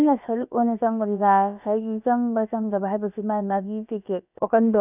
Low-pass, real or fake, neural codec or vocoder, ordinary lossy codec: 3.6 kHz; fake; codec, 16 kHz, about 1 kbps, DyCAST, with the encoder's durations; none